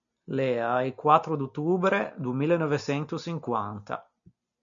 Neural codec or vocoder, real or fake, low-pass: none; real; 7.2 kHz